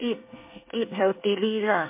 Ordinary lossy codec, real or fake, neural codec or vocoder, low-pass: MP3, 24 kbps; fake; codec, 24 kHz, 1 kbps, SNAC; 3.6 kHz